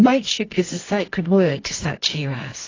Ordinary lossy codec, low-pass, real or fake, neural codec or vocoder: AAC, 32 kbps; 7.2 kHz; fake; codec, 24 kHz, 0.9 kbps, WavTokenizer, medium music audio release